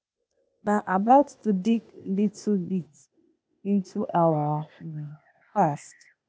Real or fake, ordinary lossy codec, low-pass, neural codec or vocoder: fake; none; none; codec, 16 kHz, 0.8 kbps, ZipCodec